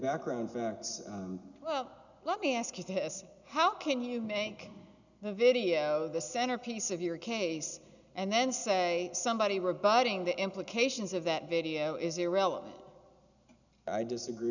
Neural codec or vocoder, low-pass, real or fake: none; 7.2 kHz; real